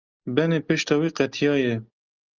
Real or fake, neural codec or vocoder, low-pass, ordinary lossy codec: real; none; 7.2 kHz; Opus, 24 kbps